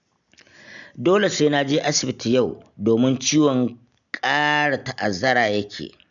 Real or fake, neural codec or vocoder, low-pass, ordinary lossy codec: real; none; 7.2 kHz; none